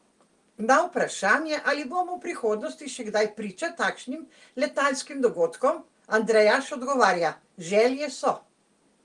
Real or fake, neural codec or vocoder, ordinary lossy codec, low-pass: real; none; Opus, 24 kbps; 10.8 kHz